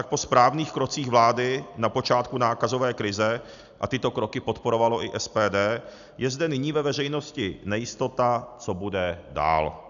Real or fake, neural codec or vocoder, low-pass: real; none; 7.2 kHz